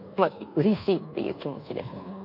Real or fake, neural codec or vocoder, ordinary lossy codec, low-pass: fake; codec, 24 kHz, 1.2 kbps, DualCodec; none; 5.4 kHz